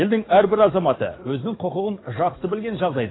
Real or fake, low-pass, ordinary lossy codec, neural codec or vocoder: fake; 7.2 kHz; AAC, 16 kbps; codec, 44.1 kHz, 7.8 kbps, DAC